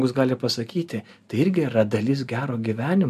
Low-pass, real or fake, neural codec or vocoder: 14.4 kHz; real; none